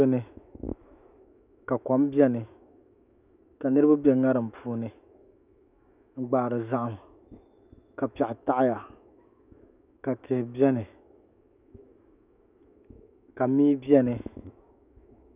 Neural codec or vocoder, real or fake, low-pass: autoencoder, 48 kHz, 128 numbers a frame, DAC-VAE, trained on Japanese speech; fake; 3.6 kHz